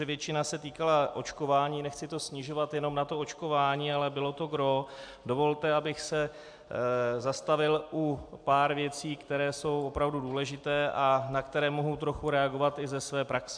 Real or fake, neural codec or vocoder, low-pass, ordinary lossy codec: real; none; 9.9 kHz; AAC, 64 kbps